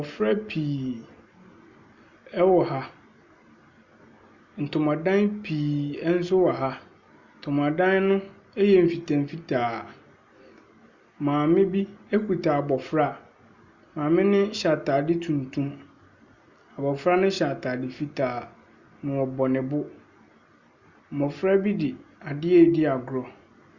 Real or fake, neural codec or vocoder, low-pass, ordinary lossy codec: real; none; 7.2 kHz; Opus, 64 kbps